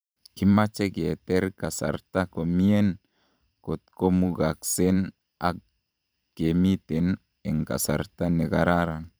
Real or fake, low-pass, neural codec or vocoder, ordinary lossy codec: fake; none; vocoder, 44.1 kHz, 128 mel bands every 512 samples, BigVGAN v2; none